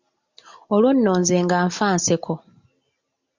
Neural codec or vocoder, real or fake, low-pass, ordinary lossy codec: none; real; 7.2 kHz; MP3, 64 kbps